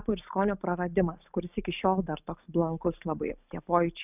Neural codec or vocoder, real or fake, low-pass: none; real; 3.6 kHz